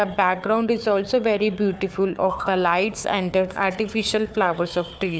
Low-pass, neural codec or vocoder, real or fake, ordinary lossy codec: none; codec, 16 kHz, 4 kbps, FunCodec, trained on Chinese and English, 50 frames a second; fake; none